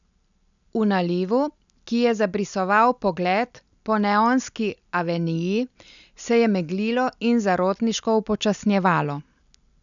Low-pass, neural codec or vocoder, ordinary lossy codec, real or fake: 7.2 kHz; none; none; real